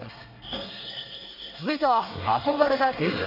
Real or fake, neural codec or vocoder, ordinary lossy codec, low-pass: fake; codec, 24 kHz, 1 kbps, SNAC; none; 5.4 kHz